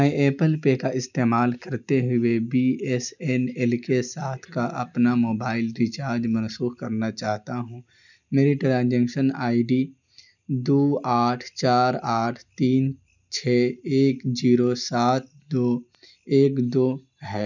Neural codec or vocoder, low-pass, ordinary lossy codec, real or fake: none; 7.2 kHz; none; real